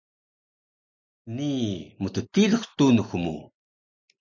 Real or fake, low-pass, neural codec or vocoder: real; 7.2 kHz; none